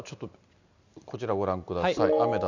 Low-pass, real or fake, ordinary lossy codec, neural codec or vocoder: 7.2 kHz; real; none; none